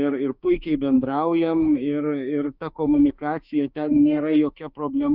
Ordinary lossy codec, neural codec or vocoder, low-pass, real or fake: Opus, 64 kbps; autoencoder, 48 kHz, 32 numbers a frame, DAC-VAE, trained on Japanese speech; 5.4 kHz; fake